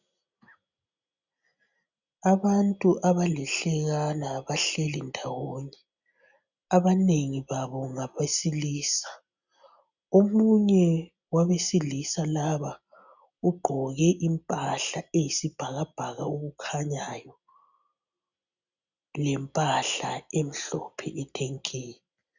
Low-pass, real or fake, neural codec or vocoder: 7.2 kHz; real; none